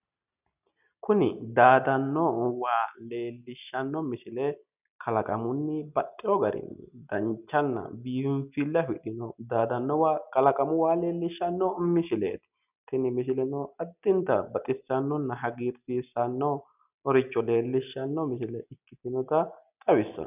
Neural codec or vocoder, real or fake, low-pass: none; real; 3.6 kHz